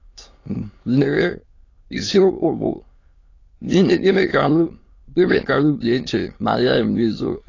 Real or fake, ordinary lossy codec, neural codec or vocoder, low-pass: fake; AAC, 32 kbps; autoencoder, 22.05 kHz, a latent of 192 numbers a frame, VITS, trained on many speakers; 7.2 kHz